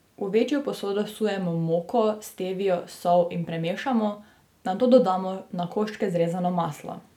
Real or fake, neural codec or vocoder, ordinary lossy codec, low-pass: real; none; none; 19.8 kHz